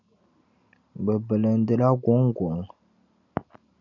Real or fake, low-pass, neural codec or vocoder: real; 7.2 kHz; none